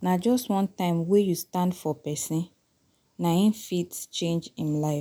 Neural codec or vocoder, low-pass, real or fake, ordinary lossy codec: none; none; real; none